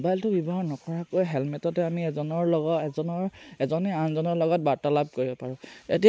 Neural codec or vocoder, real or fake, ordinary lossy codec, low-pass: none; real; none; none